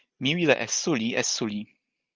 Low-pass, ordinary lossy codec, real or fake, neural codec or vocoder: 7.2 kHz; Opus, 32 kbps; real; none